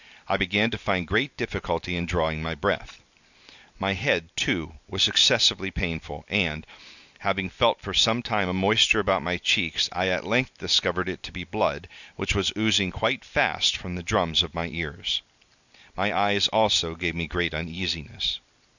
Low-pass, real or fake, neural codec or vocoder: 7.2 kHz; real; none